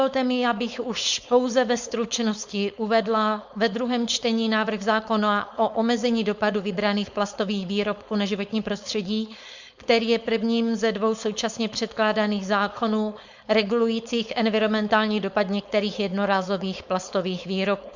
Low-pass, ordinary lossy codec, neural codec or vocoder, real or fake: 7.2 kHz; Opus, 64 kbps; codec, 16 kHz, 4.8 kbps, FACodec; fake